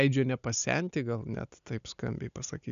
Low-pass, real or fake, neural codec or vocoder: 7.2 kHz; real; none